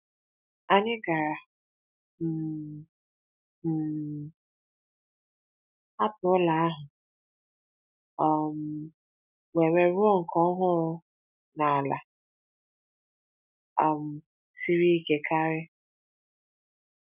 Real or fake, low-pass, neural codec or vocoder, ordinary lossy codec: real; 3.6 kHz; none; none